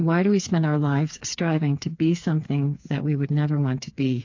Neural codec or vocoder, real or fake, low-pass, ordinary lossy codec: codec, 16 kHz, 4 kbps, FreqCodec, smaller model; fake; 7.2 kHz; AAC, 48 kbps